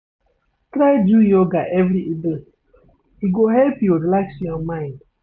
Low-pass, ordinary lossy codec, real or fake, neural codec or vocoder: 7.2 kHz; none; real; none